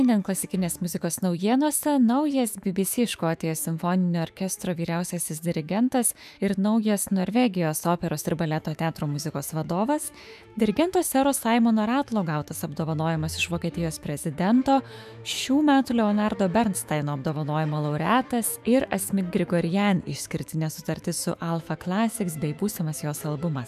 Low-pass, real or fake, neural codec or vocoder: 14.4 kHz; fake; autoencoder, 48 kHz, 128 numbers a frame, DAC-VAE, trained on Japanese speech